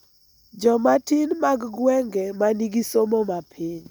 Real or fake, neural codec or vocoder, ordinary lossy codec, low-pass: real; none; none; none